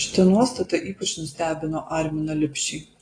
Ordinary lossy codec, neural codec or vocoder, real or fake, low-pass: AAC, 32 kbps; none; real; 9.9 kHz